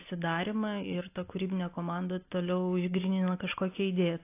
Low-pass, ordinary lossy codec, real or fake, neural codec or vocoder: 3.6 kHz; AAC, 24 kbps; real; none